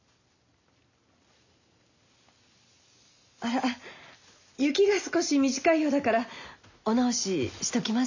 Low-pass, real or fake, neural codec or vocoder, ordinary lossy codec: 7.2 kHz; real; none; none